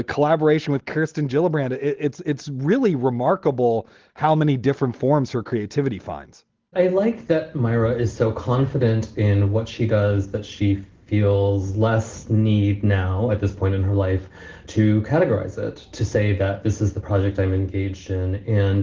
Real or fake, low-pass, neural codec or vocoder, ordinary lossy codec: real; 7.2 kHz; none; Opus, 16 kbps